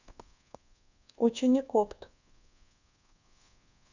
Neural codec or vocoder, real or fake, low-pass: codec, 24 kHz, 1.2 kbps, DualCodec; fake; 7.2 kHz